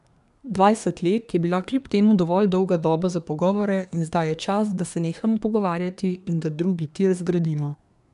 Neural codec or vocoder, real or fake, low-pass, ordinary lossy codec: codec, 24 kHz, 1 kbps, SNAC; fake; 10.8 kHz; none